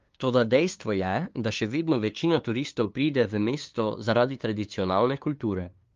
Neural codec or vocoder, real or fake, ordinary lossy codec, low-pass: codec, 16 kHz, 2 kbps, FunCodec, trained on LibriTTS, 25 frames a second; fake; Opus, 32 kbps; 7.2 kHz